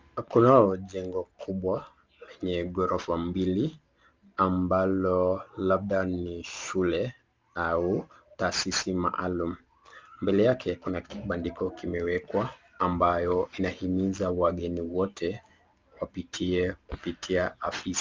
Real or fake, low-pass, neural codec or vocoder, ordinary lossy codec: real; 7.2 kHz; none; Opus, 24 kbps